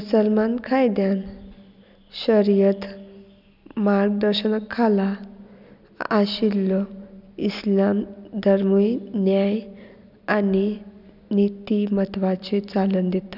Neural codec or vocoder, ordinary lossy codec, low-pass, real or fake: none; none; 5.4 kHz; real